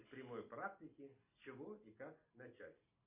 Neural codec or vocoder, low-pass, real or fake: none; 3.6 kHz; real